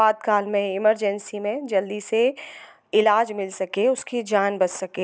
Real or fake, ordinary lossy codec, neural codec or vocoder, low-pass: real; none; none; none